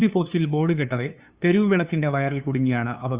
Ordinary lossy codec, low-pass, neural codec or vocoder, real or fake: Opus, 64 kbps; 3.6 kHz; codec, 16 kHz, 2 kbps, FunCodec, trained on LibriTTS, 25 frames a second; fake